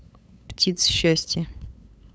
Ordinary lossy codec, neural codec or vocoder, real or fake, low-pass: none; codec, 16 kHz, 8 kbps, FunCodec, trained on LibriTTS, 25 frames a second; fake; none